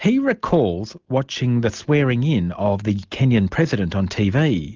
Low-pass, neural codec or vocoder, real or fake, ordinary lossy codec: 7.2 kHz; none; real; Opus, 24 kbps